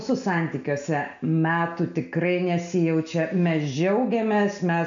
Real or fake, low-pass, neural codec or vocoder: real; 7.2 kHz; none